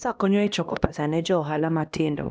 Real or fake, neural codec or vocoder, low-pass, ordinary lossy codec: fake; codec, 16 kHz, 1 kbps, X-Codec, HuBERT features, trained on LibriSpeech; none; none